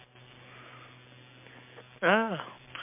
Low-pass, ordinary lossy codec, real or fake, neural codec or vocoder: 3.6 kHz; MP3, 32 kbps; real; none